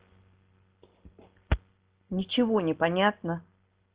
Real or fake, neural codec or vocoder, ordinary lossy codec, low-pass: real; none; Opus, 16 kbps; 3.6 kHz